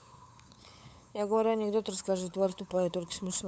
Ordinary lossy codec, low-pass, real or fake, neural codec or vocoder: none; none; fake; codec, 16 kHz, 8 kbps, FunCodec, trained on LibriTTS, 25 frames a second